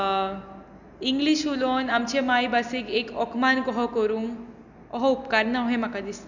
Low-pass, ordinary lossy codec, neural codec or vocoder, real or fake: 7.2 kHz; none; none; real